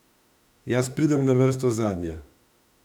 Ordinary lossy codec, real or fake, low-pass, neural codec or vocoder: none; fake; 19.8 kHz; autoencoder, 48 kHz, 32 numbers a frame, DAC-VAE, trained on Japanese speech